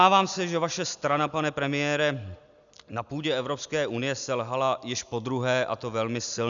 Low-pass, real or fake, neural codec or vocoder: 7.2 kHz; real; none